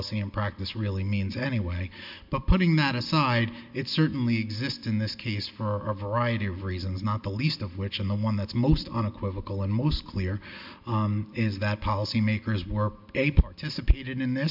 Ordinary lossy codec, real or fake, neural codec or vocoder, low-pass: MP3, 48 kbps; real; none; 5.4 kHz